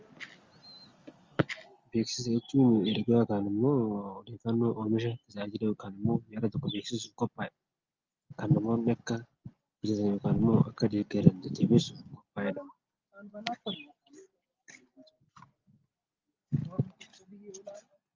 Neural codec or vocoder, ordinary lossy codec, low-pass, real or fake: none; Opus, 32 kbps; 7.2 kHz; real